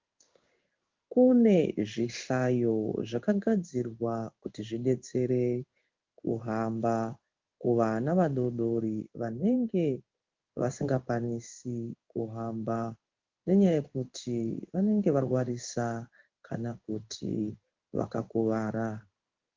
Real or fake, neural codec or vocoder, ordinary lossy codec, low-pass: fake; codec, 16 kHz in and 24 kHz out, 1 kbps, XY-Tokenizer; Opus, 24 kbps; 7.2 kHz